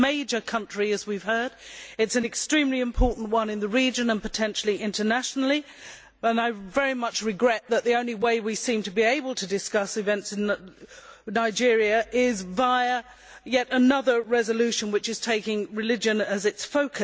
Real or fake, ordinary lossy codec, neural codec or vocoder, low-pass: real; none; none; none